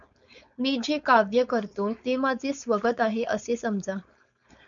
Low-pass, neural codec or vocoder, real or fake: 7.2 kHz; codec, 16 kHz, 4.8 kbps, FACodec; fake